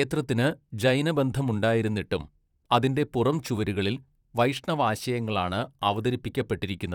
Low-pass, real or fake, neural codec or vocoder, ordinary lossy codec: 19.8 kHz; fake; vocoder, 44.1 kHz, 128 mel bands, Pupu-Vocoder; none